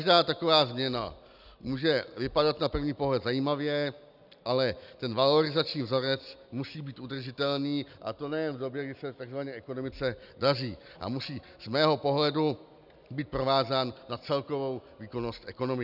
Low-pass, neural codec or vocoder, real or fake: 5.4 kHz; none; real